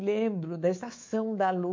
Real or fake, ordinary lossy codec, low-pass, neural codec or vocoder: fake; MP3, 48 kbps; 7.2 kHz; codec, 24 kHz, 3.1 kbps, DualCodec